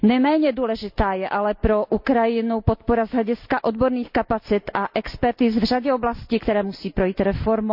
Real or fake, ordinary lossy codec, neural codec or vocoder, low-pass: real; none; none; 5.4 kHz